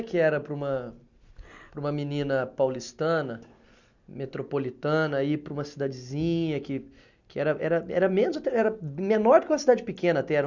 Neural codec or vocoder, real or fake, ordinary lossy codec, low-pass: none; real; none; 7.2 kHz